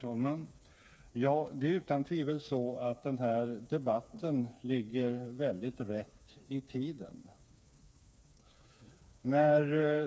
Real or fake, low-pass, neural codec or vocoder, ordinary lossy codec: fake; none; codec, 16 kHz, 4 kbps, FreqCodec, smaller model; none